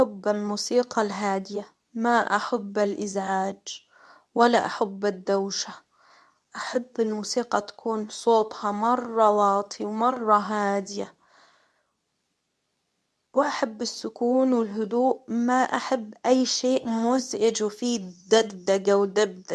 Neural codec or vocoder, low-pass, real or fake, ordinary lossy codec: codec, 24 kHz, 0.9 kbps, WavTokenizer, medium speech release version 2; none; fake; none